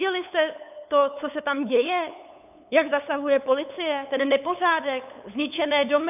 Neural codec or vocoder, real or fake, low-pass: codec, 16 kHz, 8 kbps, FunCodec, trained on LibriTTS, 25 frames a second; fake; 3.6 kHz